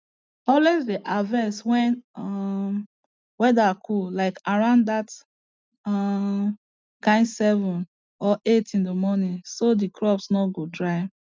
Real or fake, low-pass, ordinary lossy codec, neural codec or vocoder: real; none; none; none